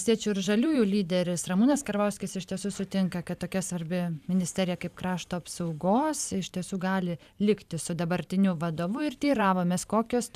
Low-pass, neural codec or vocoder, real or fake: 14.4 kHz; vocoder, 44.1 kHz, 128 mel bands every 512 samples, BigVGAN v2; fake